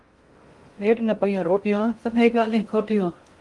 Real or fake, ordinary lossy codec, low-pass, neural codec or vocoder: fake; Opus, 24 kbps; 10.8 kHz; codec, 16 kHz in and 24 kHz out, 0.6 kbps, FocalCodec, streaming, 2048 codes